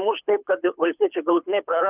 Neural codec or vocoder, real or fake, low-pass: codec, 24 kHz, 6 kbps, HILCodec; fake; 3.6 kHz